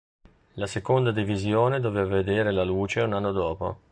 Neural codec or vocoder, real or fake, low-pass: none; real; 9.9 kHz